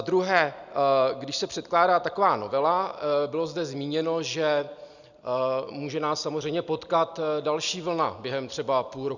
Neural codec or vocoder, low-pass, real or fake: none; 7.2 kHz; real